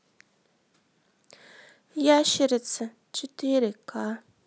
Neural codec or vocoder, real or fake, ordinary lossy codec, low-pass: none; real; none; none